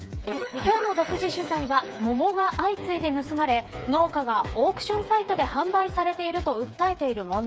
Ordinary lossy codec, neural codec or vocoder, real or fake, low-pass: none; codec, 16 kHz, 4 kbps, FreqCodec, smaller model; fake; none